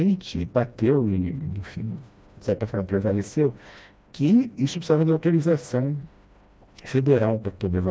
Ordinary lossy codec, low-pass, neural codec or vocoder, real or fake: none; none; codec, 16 kHz, 1 kbps, FreqCodec, smaller model; fake